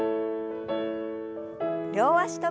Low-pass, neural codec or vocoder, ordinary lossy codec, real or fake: none; none; none; real